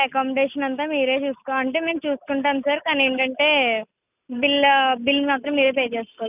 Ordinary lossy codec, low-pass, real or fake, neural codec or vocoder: none; 3.6 kHz; real; none